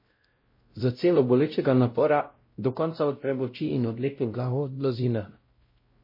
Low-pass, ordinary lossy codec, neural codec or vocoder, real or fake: 5.4 kHz; MP3, 24 kbps; codec, 16 kHz, 0.5 kbps, X-Codec, WavLM features, trained on Multilingual LibriSpeech; fake